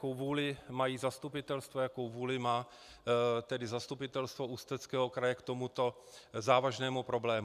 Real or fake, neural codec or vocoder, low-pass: real; none; 14.4 kHz